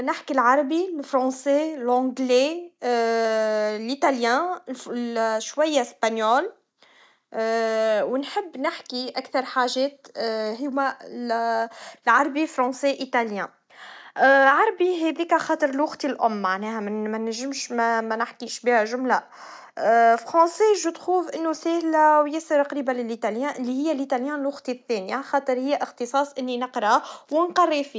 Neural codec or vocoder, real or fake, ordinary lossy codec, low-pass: none; real; none; none